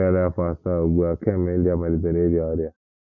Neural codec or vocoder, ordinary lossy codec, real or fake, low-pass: none; none; real; 7.2 kHz